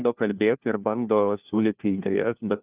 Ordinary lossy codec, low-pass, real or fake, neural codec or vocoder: Opus, 24 kbps; 3.6 kHz; fake; codec, 16 kHz, 1 kbps, FunCodec, trained on LibriTTS, 50 frames a second